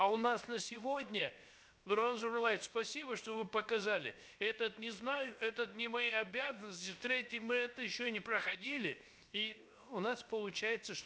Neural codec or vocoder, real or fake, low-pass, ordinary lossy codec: codec, 16 kHz, 0.7 kbps, FocalCodec; fake; none; none